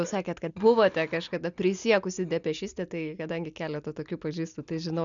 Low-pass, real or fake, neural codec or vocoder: 7.2 kHz; real; none